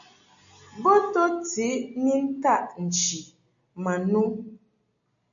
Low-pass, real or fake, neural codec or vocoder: 7.2 kHz; real; none